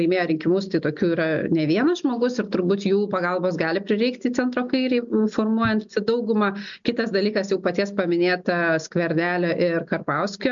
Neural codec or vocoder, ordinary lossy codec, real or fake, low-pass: none; MP3, 64 kbps; real; 7.2 kHz